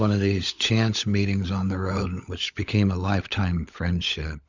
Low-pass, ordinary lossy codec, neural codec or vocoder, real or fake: 7.2 kHz; Opus, 64 kbps; codec, 16 kHz, 16 kbps, FunCodec, trained on LibriTTS, 50 frames a second; fake